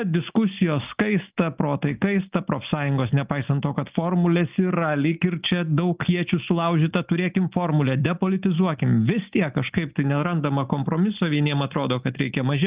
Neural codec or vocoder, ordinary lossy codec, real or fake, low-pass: none; Opus, 24 kbps; real; 3.6 kHz